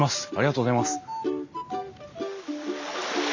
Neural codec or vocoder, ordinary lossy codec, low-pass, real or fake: none; none; 7.2 kHz; real